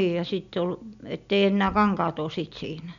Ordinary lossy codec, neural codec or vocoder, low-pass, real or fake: none; none; 7.2 kHz; real